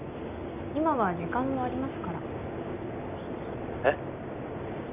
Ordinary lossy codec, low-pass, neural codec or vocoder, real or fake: none; 3.6 kHz; none; real